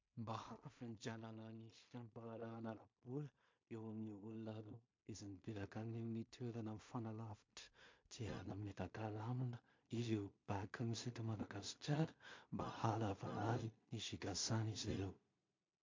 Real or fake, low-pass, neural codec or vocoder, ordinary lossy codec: fake; 7.2 kHz; codec, 16 kHz in and 24 kHz out, 0.4 kbps, LongCat-Audio-Codec, two codebook decoder; MP3, 48 kbps